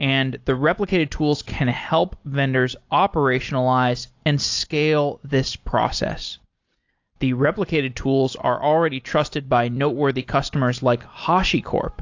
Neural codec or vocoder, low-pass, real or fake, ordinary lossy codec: none; 7.2 kHz; real; AAC, 48 kbps